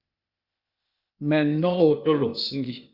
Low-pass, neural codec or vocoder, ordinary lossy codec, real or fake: 5.4 kHz; codec, 16 kHz, 0.8 kbps, ZipCodec; Opus, 64 kbps; fake